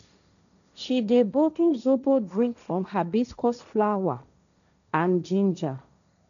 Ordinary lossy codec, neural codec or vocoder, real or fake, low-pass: none; codec, 16 kHz, 1.1 kbps, Voila-Tokenizer; fake; 7.2 kHz